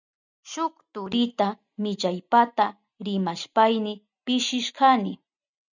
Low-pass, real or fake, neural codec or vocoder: 7.2 kHz; real; none